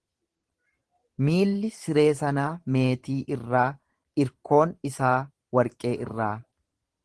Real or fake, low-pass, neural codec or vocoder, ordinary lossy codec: fake; 10.8 kHz; vocoder, 44.1 kHz, 128 mel bands every 512 samples, BigVGAN v2; Opus, 16 kbps